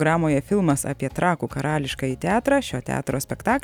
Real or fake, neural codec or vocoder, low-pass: real; none; 19.8 kHz